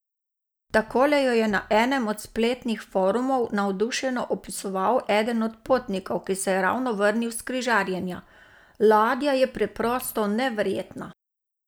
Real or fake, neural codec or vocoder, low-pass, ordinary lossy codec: real; none; none; none